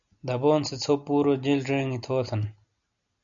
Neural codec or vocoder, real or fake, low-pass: none; real; 7.2 kHz